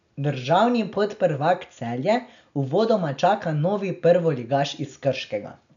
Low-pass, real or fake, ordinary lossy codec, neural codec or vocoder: 7.2 kHz; real; none; none